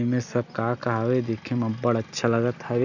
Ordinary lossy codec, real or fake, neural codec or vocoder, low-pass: none; real; none; 7.2 kHz